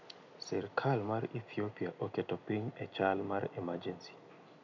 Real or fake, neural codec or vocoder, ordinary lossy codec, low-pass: real; none; none; 7.2 kHz